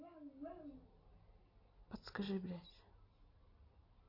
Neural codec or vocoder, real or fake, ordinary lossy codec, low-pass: none; real; AAC, 24 kbps; 5.4 kHz